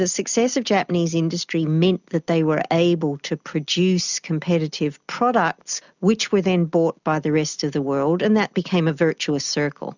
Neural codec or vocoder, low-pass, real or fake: none; 7.2 kHz; real